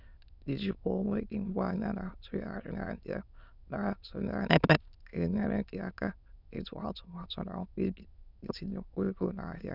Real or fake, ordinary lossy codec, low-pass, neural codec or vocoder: fake; none; 5.4 kHz; autoencoder, 22.05 kHz, a latent of 192 numbers a frame, VITS, trained on many speakers